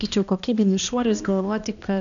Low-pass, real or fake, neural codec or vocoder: 7.2 kHz; fake; codec, 16 kHz, 1 kbps, X-Codec, HuBERT features, trained on balanced general audio